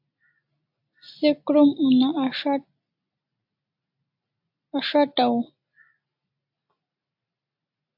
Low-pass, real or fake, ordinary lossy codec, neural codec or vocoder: 5.4 kHz; real; AAC, 48 kbps; none